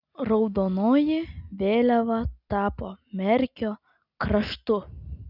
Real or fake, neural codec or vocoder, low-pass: real; none; 5.4 kHz